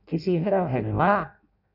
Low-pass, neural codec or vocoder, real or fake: 5.4 kHz; codec, 16 kHz in and 24 kHz out, 0.6 kbps, FireRedTTS-2 codec; fake